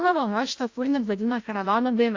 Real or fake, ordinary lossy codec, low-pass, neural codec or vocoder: fake; AAC, 48 kbps; 7.2 kHz; codec, 16 kHz, 0.5 kbps, FreqCodec, larger model